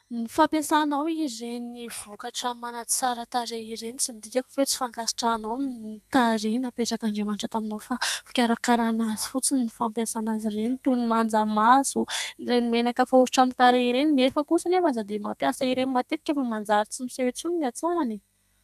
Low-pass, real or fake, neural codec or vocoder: 14.4 kHz; fake; codec, 32 kHz, 1.9 kbps, SNAC